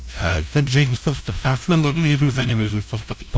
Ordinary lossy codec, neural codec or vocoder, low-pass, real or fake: none; codec, 16 kHz, 0.5 kbps, FunCodec, trained on LibriTTS, 25 frames a second; none; fake